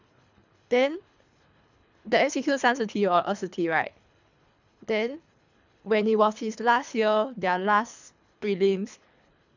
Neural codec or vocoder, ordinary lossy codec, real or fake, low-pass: codec, 24 kHz, 3 kbps, HILCodec; none; fake; 7.2 kHz